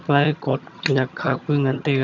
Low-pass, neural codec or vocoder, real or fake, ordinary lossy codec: 7.2 kHz; vocoder, 22.05 kHz, 80 mel bands, HiFi-GAN; fake; none